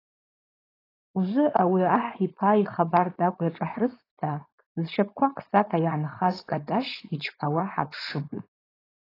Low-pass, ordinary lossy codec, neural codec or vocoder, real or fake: 5.4 kHz; AAC, 24 kbps; codec, 16 kHz, 4.8 kbps, FACodec; fake